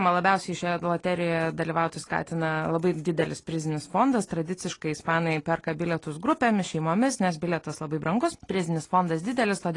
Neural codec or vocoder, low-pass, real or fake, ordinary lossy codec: none; 10.8 kHz; real; AAC, 32 kbps